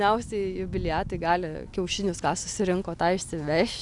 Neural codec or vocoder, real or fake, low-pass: none; real; 10.8 kHz